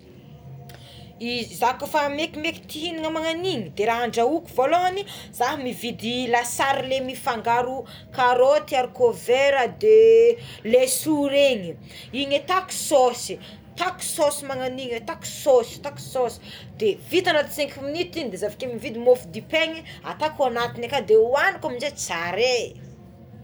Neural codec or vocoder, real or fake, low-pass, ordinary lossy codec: none; real; none; none